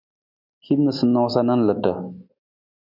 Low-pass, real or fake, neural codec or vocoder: 5.4 kHz; real; none